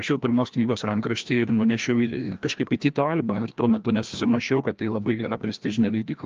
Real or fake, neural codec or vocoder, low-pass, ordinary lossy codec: fake; codec, 16 kHz, 1 kbps, FreqCodec, larger model; 7.2 kHz; Opus, 32 kbps